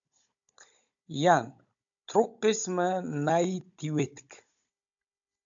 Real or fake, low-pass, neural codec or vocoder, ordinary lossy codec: fake; 7.2 kHz; codec, 16 kHz, 16 kbps, FunCodec, trained on Chinese and English, 50 frames a second; AAC, 64 kbps